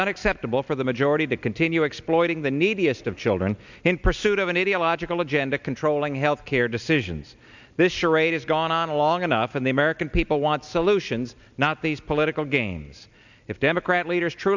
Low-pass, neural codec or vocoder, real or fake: 7.2 kHz; none; real